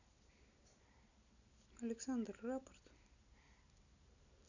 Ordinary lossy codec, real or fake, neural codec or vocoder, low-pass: none; real; none; 7.2 kHz